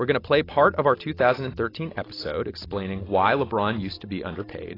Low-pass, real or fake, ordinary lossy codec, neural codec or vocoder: 5.4 kHz; real; AAC, 24 kbps; none